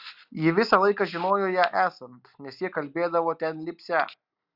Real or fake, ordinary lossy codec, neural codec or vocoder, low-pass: real; Opus, 64 kbps; none; 5.4 kHz